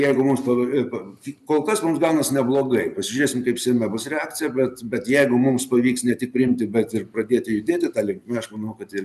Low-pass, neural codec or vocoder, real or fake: 14.4 kHz; none; real